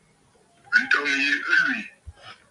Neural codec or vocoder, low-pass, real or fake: none; 10.8 kHz; real